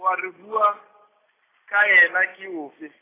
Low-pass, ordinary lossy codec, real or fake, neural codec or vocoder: 3.6 kHz; AAC, 16 kbps; real; none